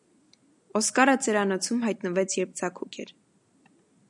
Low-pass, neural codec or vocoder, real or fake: 10.8 kHz; none; real